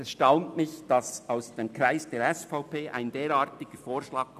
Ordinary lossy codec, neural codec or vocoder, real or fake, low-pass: none; none; real; 14.4 kHz